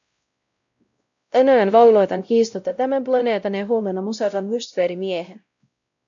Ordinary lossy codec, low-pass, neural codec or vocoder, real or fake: AAC, 64 kbps; 7.2 kHz; codec, 16 kHz, 0.5 kbps, X-Codec, WavLM features, trained on Multilingual LibriSpeech; fake